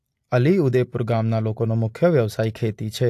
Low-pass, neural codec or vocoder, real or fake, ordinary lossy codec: 14.4 kHz; none; real; AAC, 64 kbps